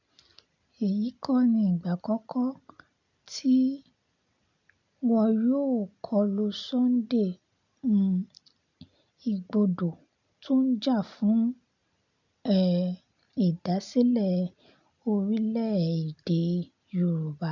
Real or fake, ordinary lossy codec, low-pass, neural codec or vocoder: real; none; 7.2 kHz; none